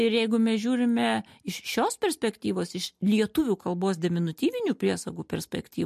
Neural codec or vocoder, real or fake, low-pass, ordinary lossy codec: none; real; 14.4 kHz; MP3, 64 kbps